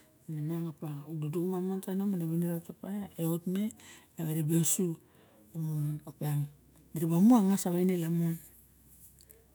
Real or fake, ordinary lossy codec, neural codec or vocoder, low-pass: fake; none; autoencoder, 48 kHz, 128 numbers a frame, DAC-VAE, trained on Japanese speech; none